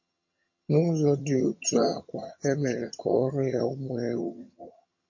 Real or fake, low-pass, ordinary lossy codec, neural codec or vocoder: fake; 7.2 kHz; MP3, 32 kbps; vocoder, 22.05 kHz, 80 mel bands, HiFi-GAN